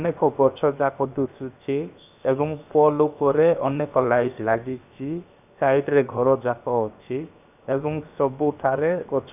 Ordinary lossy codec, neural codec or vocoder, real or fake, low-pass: none; codec, 16 kHz, 0.7 kbps, FocalCodec; fake; 3.6 kHz